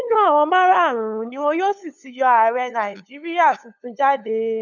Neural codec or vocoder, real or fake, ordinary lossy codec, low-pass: codec, 16 kHz, 8 kbps, FunCodec, trained on LibriTTS, 25 frames a second; fake; none; 7.2 kHz